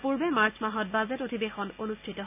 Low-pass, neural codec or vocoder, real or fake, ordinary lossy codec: 3.6 kHz; none; real; none